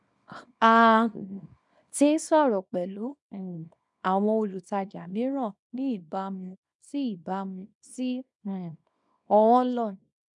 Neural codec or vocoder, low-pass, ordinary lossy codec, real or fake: codec, 24 kHz, 0.9 kbps, WavTokenizer, small release; 10.8 kHz; none; fake